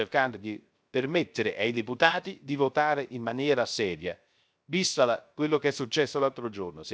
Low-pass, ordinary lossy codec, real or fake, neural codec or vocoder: none; none; fake; codec, 16 kHz, 0.3 kbps, FocalCodec